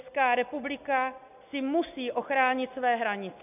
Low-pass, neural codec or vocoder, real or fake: 3.6 kHz; none; real